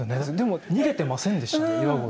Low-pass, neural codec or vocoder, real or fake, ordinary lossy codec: none; none; real; none